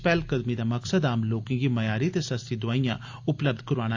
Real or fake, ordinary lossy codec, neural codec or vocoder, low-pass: real; AAC, 48 kbps; none; 7.2 kHz